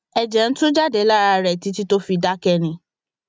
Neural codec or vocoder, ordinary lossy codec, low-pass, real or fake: none; none; none; real